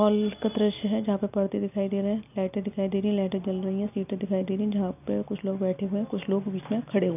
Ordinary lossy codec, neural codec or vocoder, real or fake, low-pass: none; none; real; 3.6 kHz